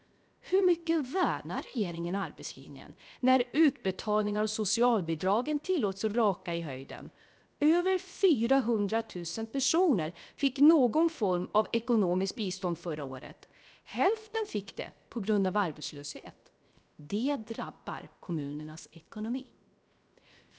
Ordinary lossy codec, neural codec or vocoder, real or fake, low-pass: none; codec, 16 kHz, 0.7 kbps, FocalCodec; fake; none